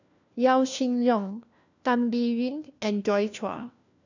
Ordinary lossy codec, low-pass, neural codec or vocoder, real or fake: none; 7.2 kHz; codec, 16 kHz, 1 kbps, FunCodec, trained on LibriTTS, 50 frames a second; fake